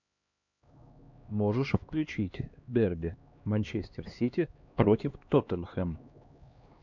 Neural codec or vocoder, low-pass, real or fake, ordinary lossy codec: codec, 16 kHz, 2 kbps, X-Codec, HuBERT features, trained on LibriSpeech; 7.2 kHz; fake; MP3, 64 kbps